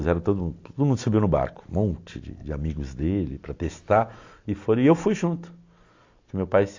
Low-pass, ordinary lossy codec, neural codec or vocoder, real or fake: 7.2 kHz; AAC, 48 kbps; none; real